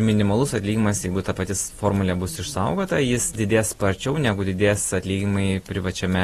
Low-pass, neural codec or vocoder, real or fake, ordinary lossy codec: 19.8 kHz; none; real; AAC, 32 kbps